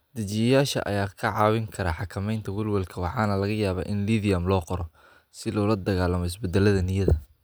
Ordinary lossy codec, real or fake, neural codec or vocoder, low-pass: none; real; none; none